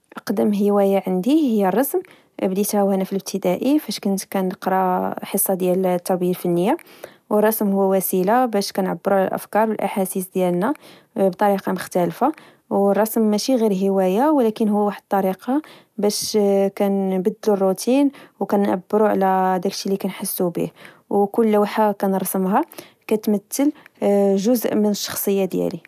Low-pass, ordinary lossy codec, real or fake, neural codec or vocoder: 14.4 kHz; none; real; none